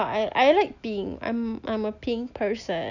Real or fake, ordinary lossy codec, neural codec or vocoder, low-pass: real; none; none; 7.2 kHz